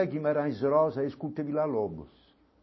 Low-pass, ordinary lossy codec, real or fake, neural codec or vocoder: 7.2 kHz; MP3, 24 kbps; real; none